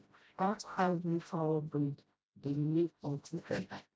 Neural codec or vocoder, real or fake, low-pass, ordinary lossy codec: codec, 16 kHz, 0.5 kbps, FreqCodec, smaller model; fake; none; none